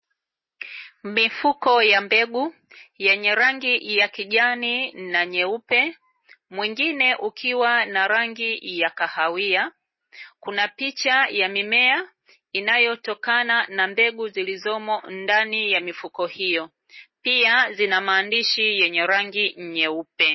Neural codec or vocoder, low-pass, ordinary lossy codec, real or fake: none; 7.2 kHz; MP3, 24 kbps; real